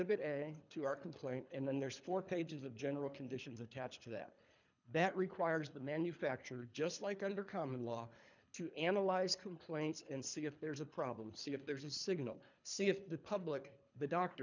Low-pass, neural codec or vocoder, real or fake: 7.2 kHz; codec, 24 kHz, 3 kbps, HILCodec; fake